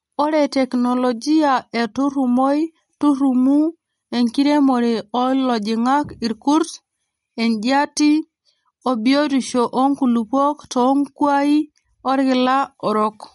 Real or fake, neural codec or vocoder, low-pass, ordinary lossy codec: real; none; 19.8 kHz; MP3, 48 kbps